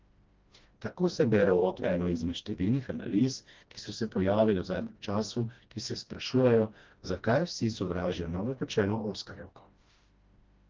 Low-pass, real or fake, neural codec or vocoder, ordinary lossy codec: 7.2 kHz; fake; codec, 16 kHz, 1 kbps, FreqCodec, smaller model; Opus, 32 kbps